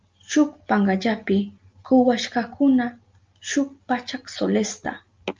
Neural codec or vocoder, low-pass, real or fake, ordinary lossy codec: none; 7.2 kHz; real; Opus, 24 kbps